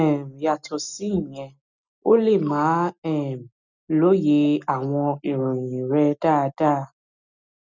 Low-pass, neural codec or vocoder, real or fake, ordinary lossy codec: 7.2 kHz; none; real; none